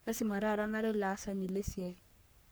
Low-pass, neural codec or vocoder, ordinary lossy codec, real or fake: none; codec, 44.1 kHz, 3.4 kbps, Pupu-Codec; none; fake